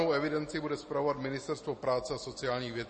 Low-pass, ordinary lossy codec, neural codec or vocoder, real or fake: 10.8 kHz; MP3, 32 kbps; none; real